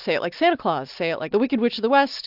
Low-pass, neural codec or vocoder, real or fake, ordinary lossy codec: 5.4 kHz; none; real; AAC, 48 kbps